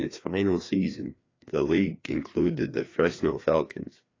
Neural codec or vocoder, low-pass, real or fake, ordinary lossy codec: codec, 16 kHz, 2 kbps, FreqCodec, larger model; 7.2 kHz; fake; AAC, 32 kbps